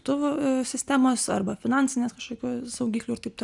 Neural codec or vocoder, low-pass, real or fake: none; 10.8 kHz; real